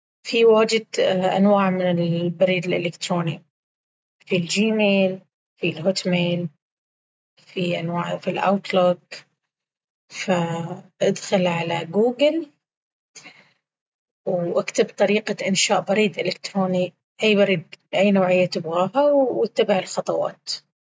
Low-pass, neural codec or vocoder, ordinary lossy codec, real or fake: none; none; none; real